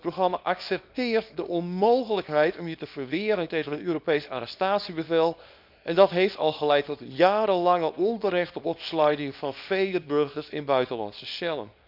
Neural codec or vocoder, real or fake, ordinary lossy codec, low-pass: codec, 24 kHz, 0.9 kbps, WavTokenizer, small release; fake; none; 5.4 kHz